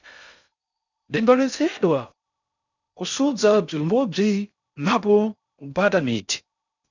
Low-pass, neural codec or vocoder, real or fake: 7.2 kHz; codec, 16 kHz in and 24 kHz out, 0.6 kbps, FocalCodec, streaming, 4096 codes; fake